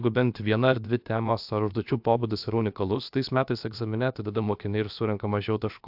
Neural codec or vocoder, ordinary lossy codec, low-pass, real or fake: codec, 16 kHz, about 1 kbps, DyCAST, with the encoder's durations; AAC, 48 kbps; 5.4 kHz; fake